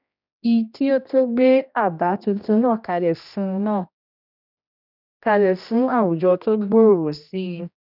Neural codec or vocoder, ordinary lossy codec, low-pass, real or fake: codec, 16 kHz, 1 kbps, X-Codec, HuBERT features, trained on general audio; none; 5.4 kHz; fake